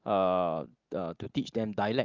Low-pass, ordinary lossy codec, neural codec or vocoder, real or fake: 7.2 kHz; Opus, 32 kbps; none; real